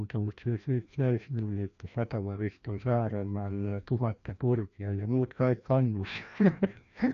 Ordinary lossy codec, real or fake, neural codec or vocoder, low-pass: none; fake; codec, 16 kHz, 1 kbps, FreqCodec, larger model; 7.2 kHz